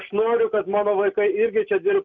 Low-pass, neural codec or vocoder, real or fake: 7.2 kHz; none; real